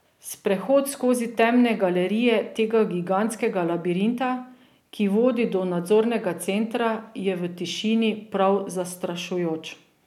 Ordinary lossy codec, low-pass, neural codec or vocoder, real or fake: none; 19.8 kHz; none; real